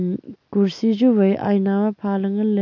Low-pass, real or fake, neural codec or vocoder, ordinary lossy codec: 7.2 kHz; real; none; none